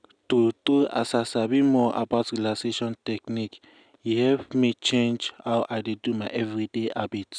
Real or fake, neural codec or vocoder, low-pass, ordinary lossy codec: real; none; 9.9 kHz; none